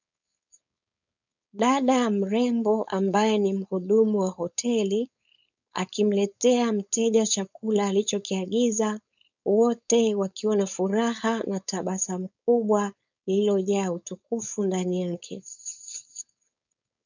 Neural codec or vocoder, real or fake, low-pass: codec, 16 kHz, 4.8 kbps, FACodec; fake; 7.2 kHz